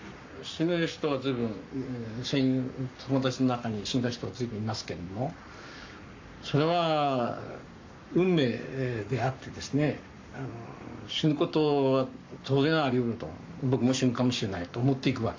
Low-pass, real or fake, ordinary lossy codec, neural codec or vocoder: 7.2 kHz; fake; AAC, 48 kbps; codec, 44.1 kHz, 7.8 kbps, Pupu-Codec